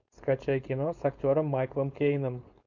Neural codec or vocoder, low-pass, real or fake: codec, 16 kHz, 4.8 kbps, FACodec; 7.2 kHz; fake